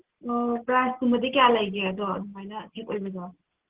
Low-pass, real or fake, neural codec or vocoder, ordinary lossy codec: 3.6 kHz; real; none; Opus, 16 kbps